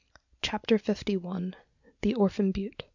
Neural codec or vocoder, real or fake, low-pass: none; real; 7.2 kHz